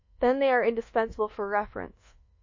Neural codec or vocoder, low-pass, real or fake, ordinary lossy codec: codec, 24 kHz, 1.2 kbps, DualCodec; 7.2 kHz; fake; MP3, 32 kbps